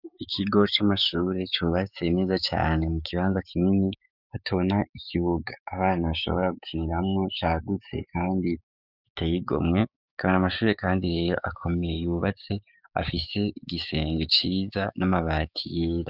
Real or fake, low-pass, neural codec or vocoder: fake; 5.4 kHz; codec, 16 kHz, 6 kbps, DAC